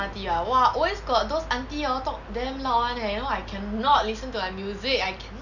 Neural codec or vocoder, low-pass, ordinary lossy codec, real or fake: none; 7.2 kHz; none; real